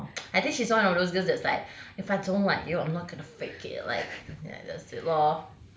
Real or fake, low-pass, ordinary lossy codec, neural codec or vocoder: real; none; none; none